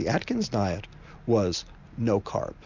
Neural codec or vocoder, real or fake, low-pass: none; real; 7.2 kHz